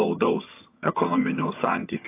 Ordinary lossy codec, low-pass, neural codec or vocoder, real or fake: AAC, 24 kbps; 3.6 kHz; vocoder, 22.05 kHz, 80 mel bands, HiFi-GAN; fake